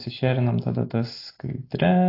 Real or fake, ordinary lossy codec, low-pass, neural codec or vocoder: real; AAC, 32 kbps; 5.4 kHz; none